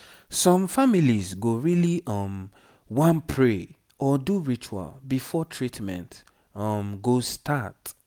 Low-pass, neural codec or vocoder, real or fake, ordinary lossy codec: none; none; real; none